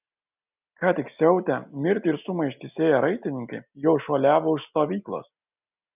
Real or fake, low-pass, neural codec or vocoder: real; 3.6 kHz; none